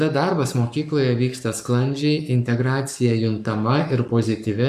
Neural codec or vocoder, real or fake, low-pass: codec, 44.1 kHz, 7.8 kbps, Pupu-Codec; fake; 14.4 kHz